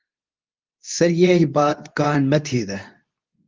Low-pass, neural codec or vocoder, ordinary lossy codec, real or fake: 7.2 kHz; codec, 24 kHz, 0.9 kbps, WavTokenizer, medium speech release version 2; Opus, 24 kbps; fake